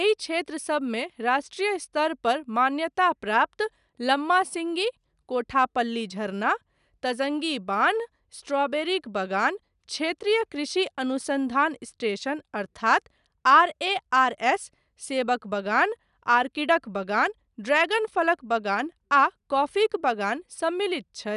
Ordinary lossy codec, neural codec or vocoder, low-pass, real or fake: none; none; 10.8 kHz; real